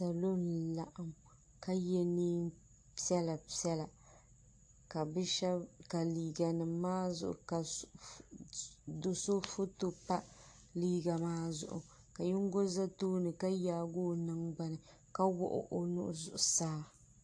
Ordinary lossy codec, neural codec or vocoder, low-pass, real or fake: AAC, 48 kbps; none; 9.9 kHz; real